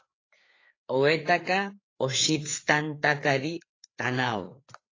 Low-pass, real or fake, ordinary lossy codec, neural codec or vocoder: 7.2 kHz; fake; AAC, 32 kbps; codec, 16 kHz, 4 kbps, FreqCodec, larger model